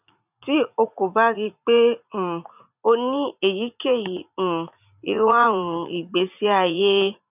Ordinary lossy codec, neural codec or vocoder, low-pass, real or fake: none; vocoder, 44.1 kHz, 80 mel bands, Vocos; 3.6 kHz; fake